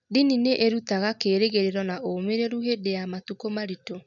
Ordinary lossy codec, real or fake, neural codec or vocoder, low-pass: none; real; none; 7.2 kHz